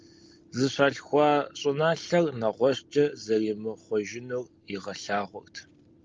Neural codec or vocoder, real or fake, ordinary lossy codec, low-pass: none; real; Opus, 32 kbps; 7.2 kHz